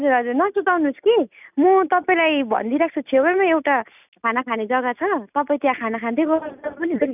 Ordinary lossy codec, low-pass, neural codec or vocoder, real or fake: none; 3.6 kHz; none; real